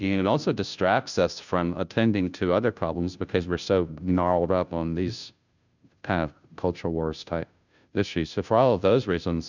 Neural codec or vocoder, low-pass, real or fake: codec, 16 kHz, 0.5 kbps, FunCodec, trained on Chinese and English, 25 frames a second; 7.2 kHz; fake